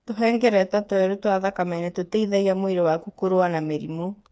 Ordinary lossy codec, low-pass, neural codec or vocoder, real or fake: none; none; codec, 16 kHz, 4 kbps, FreqCodec, smaller model; fake